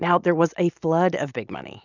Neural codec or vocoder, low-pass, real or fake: none; 7.2 kHz; real